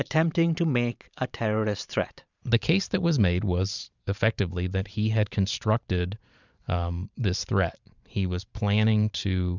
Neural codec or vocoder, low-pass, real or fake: vocoder, 44.1 kHz, 128 mel bands every 512 samples, BigVGAN v2; 7.2 kHz; fake